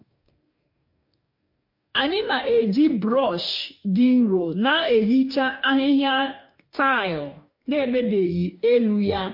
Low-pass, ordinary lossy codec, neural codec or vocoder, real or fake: 5.4 kHz; MP3, 32 kbps; codec, 44.1 kHz, 2.6 kbps, DAC; fake